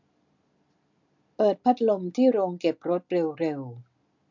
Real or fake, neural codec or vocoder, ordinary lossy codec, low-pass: real; none; MP3, 48 kbps; 7.2 kHz